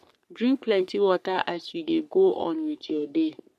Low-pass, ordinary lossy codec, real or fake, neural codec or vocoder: 14.4 kHz; none; fake; codec, 44.1 kHz, 3.4 kbps, Pupu-Codec